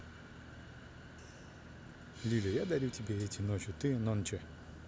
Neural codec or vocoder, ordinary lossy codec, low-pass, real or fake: none; none; none; real